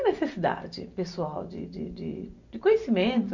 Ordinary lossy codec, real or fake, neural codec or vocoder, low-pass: none; real; none; 7.2 kHz